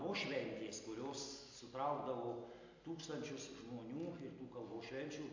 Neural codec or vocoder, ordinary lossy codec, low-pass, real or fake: none; AAC, 48 kbps; 7.2 kHz; real